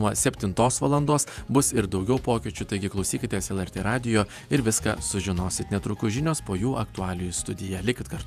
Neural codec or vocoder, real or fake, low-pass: vocoder, 48 kHz, 128 mel bands, Vocos; fake; 14.4 kHz